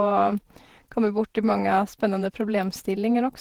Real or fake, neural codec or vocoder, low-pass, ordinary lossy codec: fake; vocoder, 48 kHz, 128 mel bands, Vocos; 19.8 kHz; Opus, 16 kbps